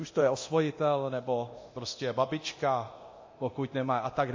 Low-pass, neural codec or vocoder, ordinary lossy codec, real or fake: 7.2 kHz; codec, 24 kHz, 0.9 kbps, DualCodec; MP3, 32 kbps; fake